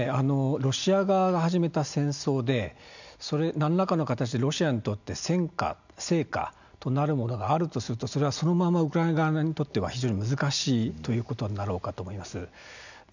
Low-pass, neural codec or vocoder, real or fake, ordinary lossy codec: 7.2 kHz; none; real; none